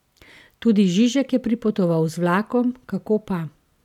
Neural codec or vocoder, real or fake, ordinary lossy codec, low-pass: none; real; none; 19.8 kHz